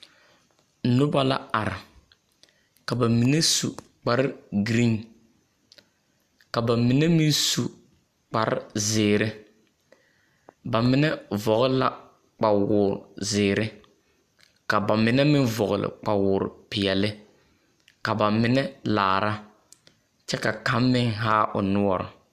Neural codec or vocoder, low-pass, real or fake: none; 14.4 kHz; real